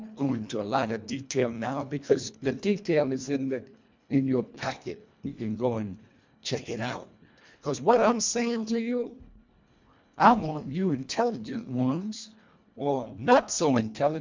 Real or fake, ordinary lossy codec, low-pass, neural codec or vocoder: fake; MP3, 64 kbps; 7.2 kHz; codec, 24 kHz, 1.5 kbps, HILCodec